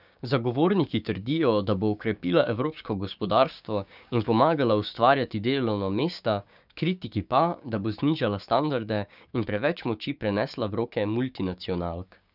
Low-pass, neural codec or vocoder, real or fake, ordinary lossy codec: 5.4 kHz; codec, 16 kHz, 6 kbps, DAC; fake; none